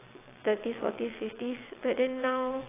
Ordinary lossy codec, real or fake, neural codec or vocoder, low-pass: AAC, 16 kbps; fake; vocoder, 22.05 kHz, 80 mel bands, WaveNeXt; 3.6 kHz